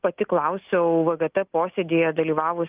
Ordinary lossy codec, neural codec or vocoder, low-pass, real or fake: Opus, 32 kbps; none; 3.6 kHz; real